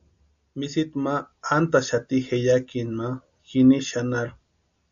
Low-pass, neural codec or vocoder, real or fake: 7.2 kHz; none; real